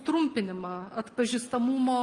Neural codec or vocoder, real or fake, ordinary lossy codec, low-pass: none; real; Opus, 32 kbps; 10.8 kHz